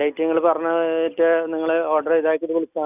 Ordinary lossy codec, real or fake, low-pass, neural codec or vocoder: none; real; 3.6 kHz; none